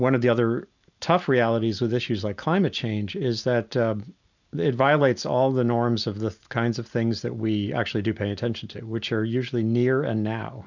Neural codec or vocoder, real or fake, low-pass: none; real; 7.2 kHz